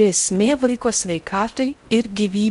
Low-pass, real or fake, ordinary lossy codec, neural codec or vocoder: 10.8 kHz; fake; MP3, 64 kbps; codec, 16 kHz in and 24 kHz out, 0.6 kbps, FocalCodec, streaming, 4096 codes